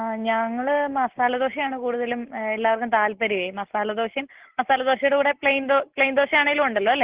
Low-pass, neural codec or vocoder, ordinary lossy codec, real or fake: 3.6 kHz; none; Opus, 16 kbps; real